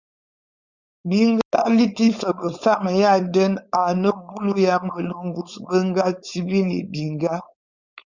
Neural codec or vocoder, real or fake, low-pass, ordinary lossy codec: codec, 16 kHz, 4.8 kbps, FACodec; fake; 7.2 kHz; Opus, 64 kbps